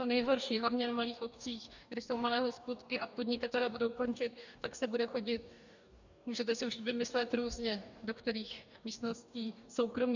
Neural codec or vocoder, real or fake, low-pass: codec, 44.1 kHz, 2.6 kbps, DAC; fake; 7.2 kHz